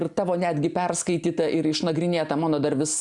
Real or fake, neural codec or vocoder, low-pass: real; none; 10.8 kHz